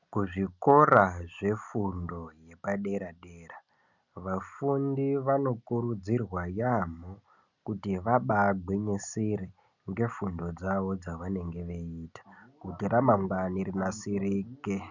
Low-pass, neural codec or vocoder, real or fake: 7.2 kHz; none; real